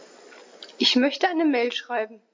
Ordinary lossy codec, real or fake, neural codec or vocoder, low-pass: MP3, 48 kbps; real; none; 7.2 kHz